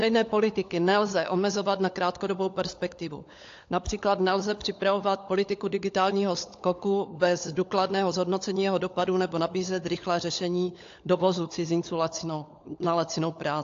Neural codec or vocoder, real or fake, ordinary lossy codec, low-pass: codec, 16 kHz, 16 kbps, FunCodec, trained on LibriTTS, 50 frames a second; fake; AAC, 48 kbps; 7.2 kHz